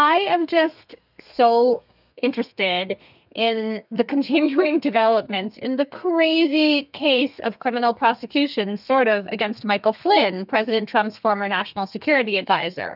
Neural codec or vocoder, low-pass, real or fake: codec, 32 kHz, 1.9 kbps, SNAC; 5.4 kHz; fake